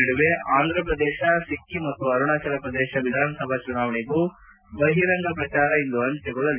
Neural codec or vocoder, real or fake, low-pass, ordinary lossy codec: none; real; 3.6 kHz; none